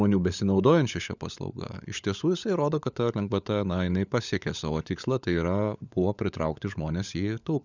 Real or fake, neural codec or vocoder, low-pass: fake; codec, 16 kHz, 16 kbps, FunCodec, trained on LibriTTS, 50 frames a second; 7.2 kHz